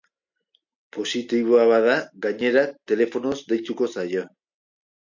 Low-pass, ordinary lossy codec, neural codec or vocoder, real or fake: 7.2 kHz; MP3, 48 kbps; none; real